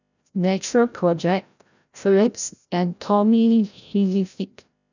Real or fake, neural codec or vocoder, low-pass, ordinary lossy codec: fake; codec, 16 kHz, 0.5 kbps, FreqCodec, larger model; 7.2 kHz; none